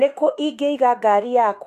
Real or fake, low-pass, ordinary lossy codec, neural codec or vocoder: fake; 14.4 kHz; AAC, 64 kbps; autoencoder, 48 kHz, 32 numbers a frame, DAC-VAE, trained on Japanese speech